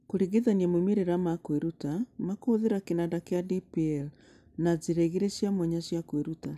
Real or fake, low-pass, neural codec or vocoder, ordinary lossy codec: real; 14.4 kHz; none; none